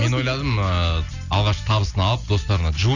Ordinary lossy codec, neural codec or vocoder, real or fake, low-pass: none; none; real; 7.2 kHz